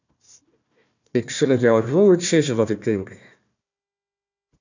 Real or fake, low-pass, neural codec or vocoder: fake; 7.2 kHz; codec, 16 kHz, 1 kbps, FunCodec, trained on Chinese and English, 50 frames a second